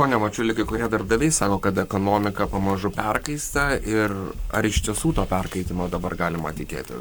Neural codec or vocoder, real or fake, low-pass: codec, 44.1 kHz, 7.8 kbps, Pupu-Codec; fake; 19.8 kHz